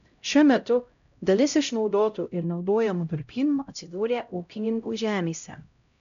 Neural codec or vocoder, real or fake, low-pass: codec, 16 kHz, 0.5 kbps, X-Codec, HuBERT features, trained on LibriSpeech; fake; 7.2 kHz